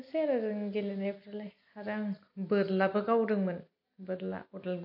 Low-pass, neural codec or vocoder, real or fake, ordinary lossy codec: 5.4 kHz; none; real; MP3, 32 kbps